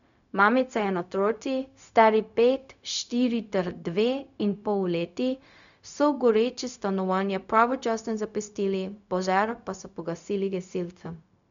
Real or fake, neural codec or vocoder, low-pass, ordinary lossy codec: fake; codec, 16 kHz, 0.4 kbps, LongCat-Audio-Codec; 7.2 kHz; none